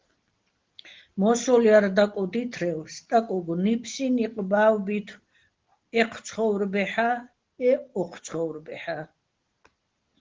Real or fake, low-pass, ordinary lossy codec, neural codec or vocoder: real; 7.2 kHz; Opus, 16 kbps; none